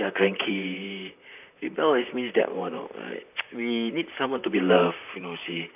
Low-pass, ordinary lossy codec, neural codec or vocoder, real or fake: 3.6 kHz; none; vocoder, 44.1 kHz, 128 mel bands, Pupu-Vocoder; fake